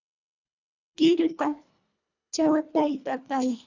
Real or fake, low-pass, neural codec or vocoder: fake; 7.2 kHz; codec, 24 kHz, 1.5 kbps, HILCodec